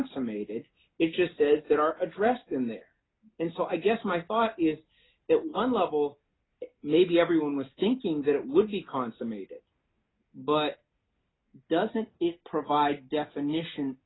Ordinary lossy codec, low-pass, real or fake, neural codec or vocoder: AAC, 16 kbps; 7.2 kHz; real; none